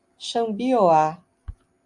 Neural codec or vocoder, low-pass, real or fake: none; 10.8 kHz; real